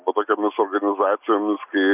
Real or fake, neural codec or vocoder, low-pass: real; none; 3.6 kHz